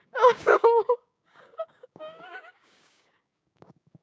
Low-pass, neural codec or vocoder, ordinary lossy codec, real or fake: none; codec, 16 kHz, 6 kbps, DAC; none; fake